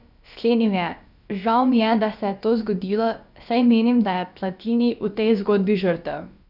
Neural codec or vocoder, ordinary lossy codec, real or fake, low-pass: codec, 16 kHz, about 1 kbps, DyCAST, with the encoder's durations; none; fake; 5.4 kHz